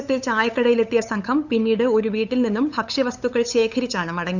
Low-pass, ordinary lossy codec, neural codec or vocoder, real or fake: 7.2 kHz; none; codec, 16 kHz, 8 kbps, FunCodec, trained on LibriTTS, 25 frames a second; fake